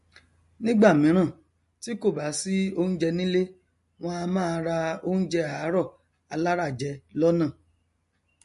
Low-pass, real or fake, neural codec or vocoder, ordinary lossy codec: 14.4 kHz; real; none; MP3, 48 kbps